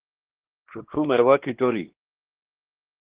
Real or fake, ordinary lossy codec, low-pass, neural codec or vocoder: fake; Opus, 16 kbps; 3.6 kHz; codec, 16 kHz, 2 kbps, X-Codec, WavLM features, trained on Multilingual LibriSpeech